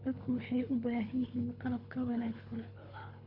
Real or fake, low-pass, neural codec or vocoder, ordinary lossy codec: fake; 5.4 kHz; codec, 24 kHz, 3 kbps, HILCodec; none